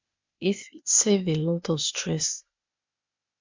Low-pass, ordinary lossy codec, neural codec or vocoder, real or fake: 7.2 kHz; MP3, 64 kbps; codec, 16 kHz, 0.8 kbps, ZipCodec; fake